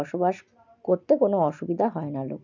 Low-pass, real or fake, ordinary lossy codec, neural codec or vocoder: 7.2 kHz; real; none; none